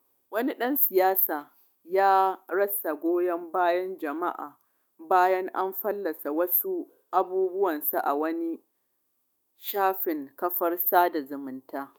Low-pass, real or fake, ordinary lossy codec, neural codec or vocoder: 19.8 kHz; fake; none; autoencoder, 48 kHz, 128 numbers a frame, DAC-VAE, trained on Japanese speech